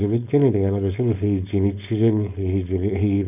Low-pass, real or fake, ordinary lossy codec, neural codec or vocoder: 3.6 kHz; fake; none; codec, 16 kHz, 4.8 kbps, FACodec